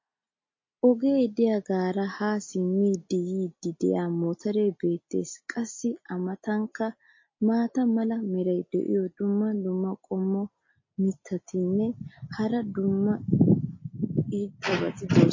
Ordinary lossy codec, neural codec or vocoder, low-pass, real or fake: MP3, 32 kbps; none; 7.2 kHz; real